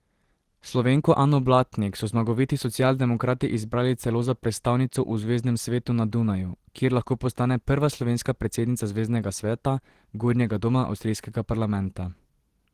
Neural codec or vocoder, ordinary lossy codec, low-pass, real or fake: none; Opus, 16 kbps; 14.4 kHz; real